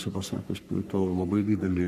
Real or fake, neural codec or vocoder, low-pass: fake; codec, 44.1 kHz, 3.4 kbps, Pupu-Codec; 14.4 kHz